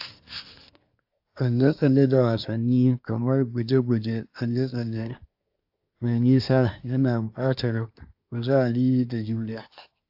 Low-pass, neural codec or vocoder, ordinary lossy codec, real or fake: 5.4 kHz; codec, 24 kHz, 1 kbps, SNAC; AAC, 48 kbps; fake